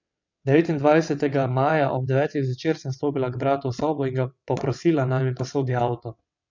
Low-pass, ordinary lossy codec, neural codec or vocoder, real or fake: 7.2 kHz; none; vocoder, 22.05 kHz, 80 mel bands, WaveNeXt; fake